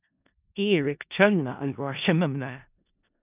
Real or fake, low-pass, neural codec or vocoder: fake; 3.6 kHz; codec, 16 kHz in and 24 kHz out, 0.4 kbps, LongCat-Audio-Codec, four codebook decoder